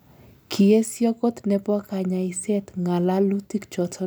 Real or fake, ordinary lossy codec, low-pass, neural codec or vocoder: real; none; none; none